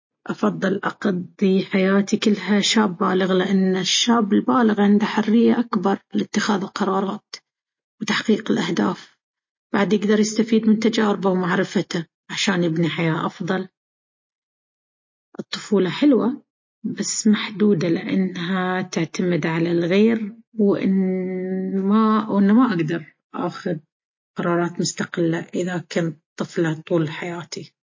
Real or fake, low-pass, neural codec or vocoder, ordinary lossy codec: real; 7.2 kHz; none; MP3, 32 kbps